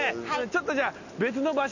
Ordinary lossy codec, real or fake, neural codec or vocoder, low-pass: none; real; none; 7.2 kHz